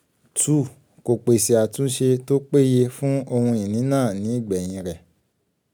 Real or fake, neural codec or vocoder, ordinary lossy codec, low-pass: real; none; none; none